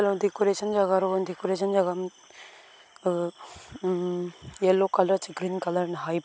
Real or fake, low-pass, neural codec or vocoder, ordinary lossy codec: real; none; none; none